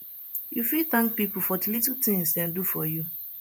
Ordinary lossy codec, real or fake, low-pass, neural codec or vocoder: none; fake; none; vocoder, 48 kHz, 128 mel bands, Vocos